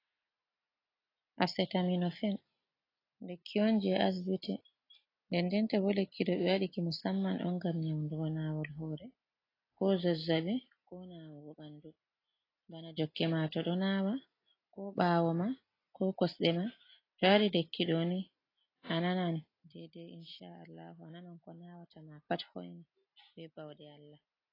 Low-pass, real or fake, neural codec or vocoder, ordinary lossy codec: 5.4 kHz; real; none; AAC, 24 kbps